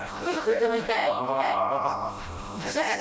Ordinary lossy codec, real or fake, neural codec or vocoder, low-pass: none; fake; codec, 16 kHz, 0.5 kbps, FreqCodec, smaller model; none